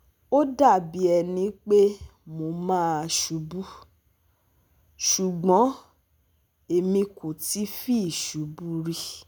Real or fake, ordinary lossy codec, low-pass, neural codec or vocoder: real; none; none; none